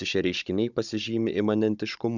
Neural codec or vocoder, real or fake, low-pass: codec, 16 kHz, 16 kbps, FunCodec, trained on Chinese and English, 50 frames a second; fake; 7.2 kHz